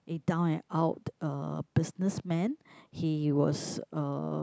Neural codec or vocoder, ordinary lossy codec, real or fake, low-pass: none; none; real; none